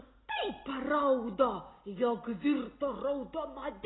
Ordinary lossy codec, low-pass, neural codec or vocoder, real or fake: AAC, 16 kbps; 7.2 kHz; none; real